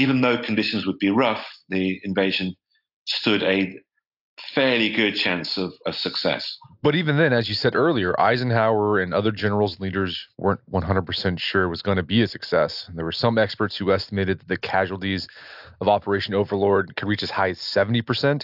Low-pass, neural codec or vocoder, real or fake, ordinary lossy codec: 5.4 kHz; none; real; AAC, 48 kbps